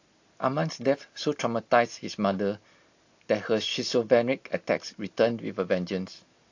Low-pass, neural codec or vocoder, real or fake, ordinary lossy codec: 7.2 kHz; none; real; AAC, 48 kbps